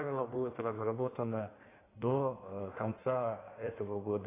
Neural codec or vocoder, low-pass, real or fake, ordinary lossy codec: codec, 16 kHz in and 24 kHz out, 1.1 kbps, FireRedTTS-2 codec; 3.6 kHz; fake; AAC, 16 kbps